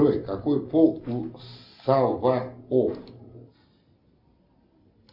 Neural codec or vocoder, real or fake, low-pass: none; real; 5.4 kHz